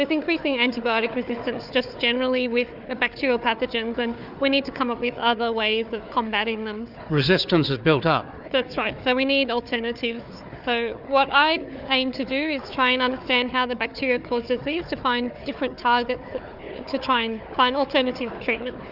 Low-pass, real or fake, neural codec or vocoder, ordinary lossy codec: 5.4 kHz; fake; codec, 16 kHz, 4 kbps, FunCodec, trained on Chinese and English, 50 frames a second; Opus, 64 kbps